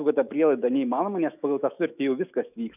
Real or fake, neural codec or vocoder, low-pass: fake; autoencoder, 48 kHz, 128 numbers a frame, DAC-VAE, trained on Japanese speech; 3.6 kHz